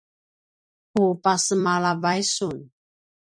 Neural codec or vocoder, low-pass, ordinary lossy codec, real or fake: vocoder, 44.1 kHz, 128 mel bands every 256 samples, BigVGAN v2; 9.9 kHz; MP3, 48 kbps; fake